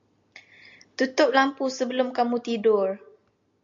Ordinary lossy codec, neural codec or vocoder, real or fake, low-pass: MP3, 64 kbps; none; real; 7.2 kHz